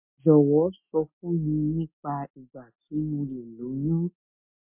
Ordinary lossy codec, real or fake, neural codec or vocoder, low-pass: MP3, 32 kbps; real; none; 3.6 kHz